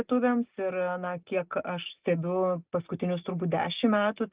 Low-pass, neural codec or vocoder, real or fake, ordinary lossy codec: 3.6 kHz; none; real; Opus, 32 kbps